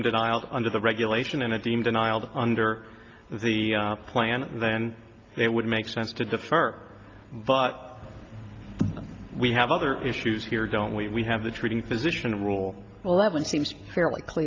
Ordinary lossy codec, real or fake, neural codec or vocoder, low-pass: Opus, 24 kbps; real; none; 7.2 kHz